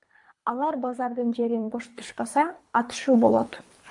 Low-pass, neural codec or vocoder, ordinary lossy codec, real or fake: 10.8 kHz; codec, 24 kHz, 3 kbps, HILCodec; MP3, 64 kbps; fake